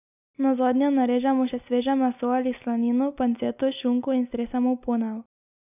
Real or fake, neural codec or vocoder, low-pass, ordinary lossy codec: real; none; 3.6 kHz; none